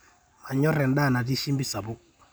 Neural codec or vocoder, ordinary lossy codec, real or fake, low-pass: none; none; real; none